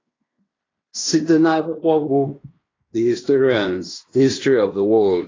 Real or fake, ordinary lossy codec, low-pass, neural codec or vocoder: fake; AAC, 32 kbps; 7.2 kHz; codec, 16 kHz in and 24 kHz out, 0.9 kbps, LongCat-Audio-Codec, fine tuned four codebook decoder